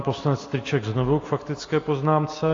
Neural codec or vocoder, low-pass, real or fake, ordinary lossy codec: none; 7.2 kHz; real; AAC, 32 kbps